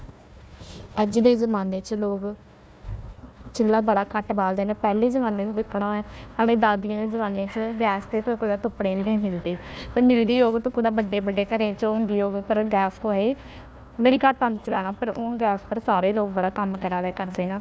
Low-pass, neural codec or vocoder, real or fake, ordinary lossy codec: none; codec, 16 kHz, 1 kbps, FunCodec, trained on Chinese and English, 50 frames a second; fake; none